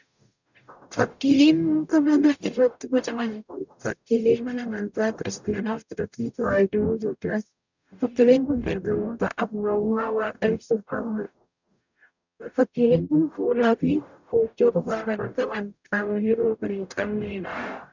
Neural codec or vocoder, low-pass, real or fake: codec, 44.1 kHz, 0.9 kbps, DAC; 7.2 kHz; fake